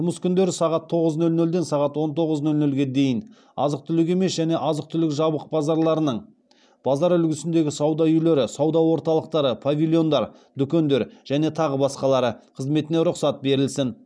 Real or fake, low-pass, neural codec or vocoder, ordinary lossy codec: real; none; none; none